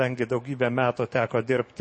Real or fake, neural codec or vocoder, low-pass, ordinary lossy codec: fake; codec, 44.1 kHz, 7.8 kbps, DAC; 9.9 kHz; MP3, 32 kbps